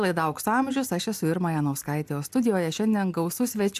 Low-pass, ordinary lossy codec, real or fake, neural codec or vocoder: 14.4 kHz; AAC, 96 kbps; real; none